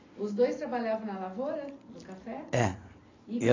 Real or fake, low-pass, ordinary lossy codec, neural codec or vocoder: real; 7.2 kHz; AAC, 32 kbps; none